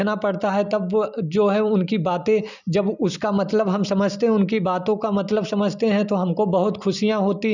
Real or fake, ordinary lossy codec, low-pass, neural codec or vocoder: real; none; 7.2 kHz; none